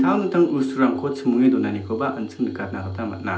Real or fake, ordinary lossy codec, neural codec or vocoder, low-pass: real; none; none; none